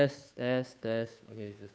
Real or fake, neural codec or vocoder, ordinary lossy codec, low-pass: fake; codec, 16 kHz, 2 kbps, FunCodec, trained on Chinese and English, 25 frames a second; none; none